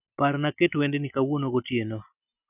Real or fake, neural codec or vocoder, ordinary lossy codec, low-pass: real; none; none; 3.6 kHz